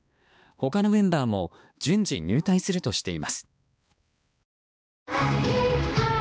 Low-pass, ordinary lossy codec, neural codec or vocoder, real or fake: none; none; codec, 16 kHz, 2 kbps, X-Codec, HuBERT features, trained on balanced general audio; fake